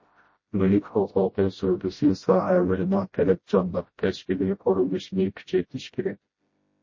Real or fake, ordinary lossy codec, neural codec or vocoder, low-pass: fake; MP3, 32 kbps; codec, 16 kHz, 0.5 kbps, FreqCodec, smaller model; 7.2 kHz